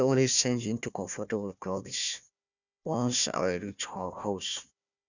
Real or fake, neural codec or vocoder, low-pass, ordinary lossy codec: fake; codec, 16 kHz, 1 kbps, FunCodec, trained on Chinese and English, 50 frames a second; 7.2 kHz; none